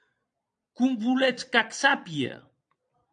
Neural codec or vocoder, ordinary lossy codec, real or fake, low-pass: vocoder, 22.05 kHz, 80 mel bands, Vocos; AAC, 64 kbps; fake; 9.9 kHz